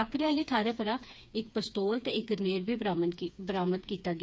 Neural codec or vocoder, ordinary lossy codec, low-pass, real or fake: codec, 16 kHz, 4 kbps, FreqCodec, smaller model; none; none; fake